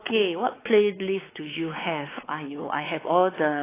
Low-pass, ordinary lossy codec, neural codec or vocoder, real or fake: 3.6 kHz; AAC, 16 kbps; codec, 16 kHz, 4 kbps, X-Codec, WavLM features, trained on Multilingual LibriSpeech; fake